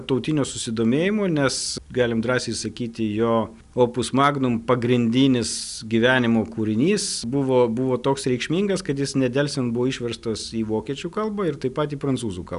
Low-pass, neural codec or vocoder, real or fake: 10.8 kHz; none; real